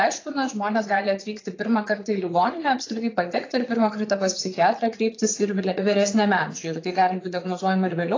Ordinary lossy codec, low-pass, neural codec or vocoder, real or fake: AAC, 32 kbps; 7.2 kHz; vocoder, 22.05 kHz, 80 mel bands, Vocos; fake